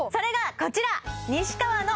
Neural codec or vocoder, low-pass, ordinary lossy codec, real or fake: none; none; none; real